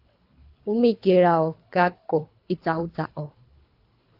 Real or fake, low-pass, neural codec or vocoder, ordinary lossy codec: fake; 5.4 kHz; codec, 24 kHz, 3 kbps, HILCodec; AAC, 32 kbps